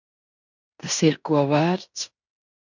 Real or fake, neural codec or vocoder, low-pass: fake; codec, 16 kHz in and 24 kHz out, 0.9 kbps, LongCat-Audio-Codec, fine tuned four codebook decoder; 7.2 kHz